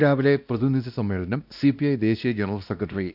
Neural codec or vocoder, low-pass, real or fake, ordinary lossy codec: codec, 16 kHz, 1 kbps, X-Codec, WavLM features, trained on Multilingual LibriSpeech; 5.4 kHz; fake; none